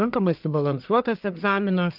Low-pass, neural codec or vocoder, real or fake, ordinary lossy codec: 5.4 kHz; codec, 44.1 kHz, 1.7 kbps, Pupu-Codec; fake; Opus, 24 kbps